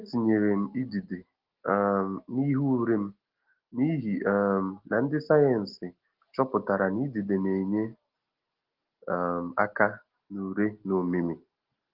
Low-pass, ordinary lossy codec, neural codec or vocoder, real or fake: 5.4 kHz; Opus, 16 kbps; none; real